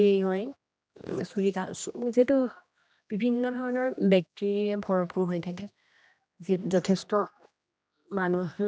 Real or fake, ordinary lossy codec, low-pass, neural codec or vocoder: fake; none; none; codec, 16 kHz, 1 kbps, X-Codec, HuBERT features, trained on general audio